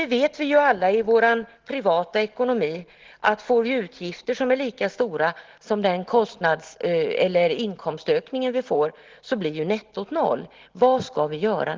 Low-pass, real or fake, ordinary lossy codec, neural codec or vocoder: 7.2 kHz; real; Opus, 16 kbps; none